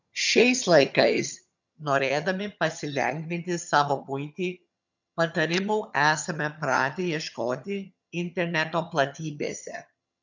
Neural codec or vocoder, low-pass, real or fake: vocoder, 22.05 kHz, 80 mel bands, HiFi-GAN; 7.2 kHz; fake